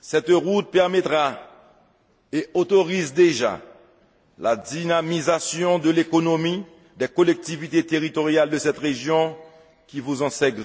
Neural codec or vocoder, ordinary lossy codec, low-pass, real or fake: none; none; none; real